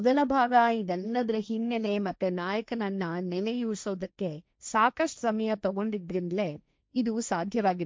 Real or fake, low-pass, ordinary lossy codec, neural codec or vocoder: fake; none; none; codec, 16 kHz, 1.1 kbps, Voila-Tokenizer